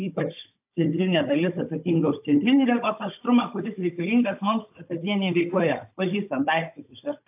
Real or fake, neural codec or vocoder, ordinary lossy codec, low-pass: fake; codec, 16 kHz, 16 kbps, FunCodec, trained on Chinese and English, 50 frames a second; AAC, 32 kbps; 3.6 kHz